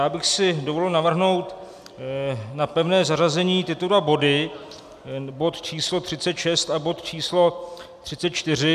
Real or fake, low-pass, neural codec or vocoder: real; 14.4 kHz; none